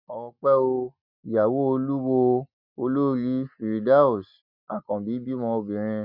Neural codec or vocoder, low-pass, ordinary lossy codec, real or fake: none; 5.4 kHz; none; real